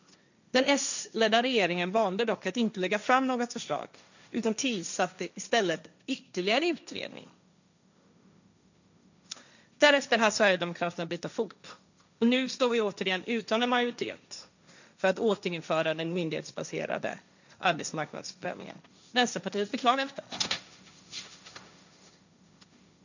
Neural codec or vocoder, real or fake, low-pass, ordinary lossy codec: codec, 16 kHz, 1.1 kbps, Voila-Tokenizer; fake; 7.2 kHz; none